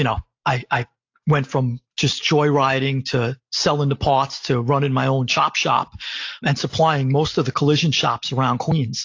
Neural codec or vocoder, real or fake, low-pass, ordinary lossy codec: none; real; 7.2 kHz; AAC, 48 kbps